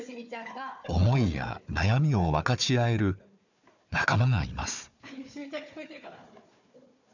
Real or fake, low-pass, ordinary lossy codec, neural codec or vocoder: fake; 7.2 kHz; none; codec, 16 kHz, 4 kbps, FunCodec, trained on Chinese and English, 50 frames a second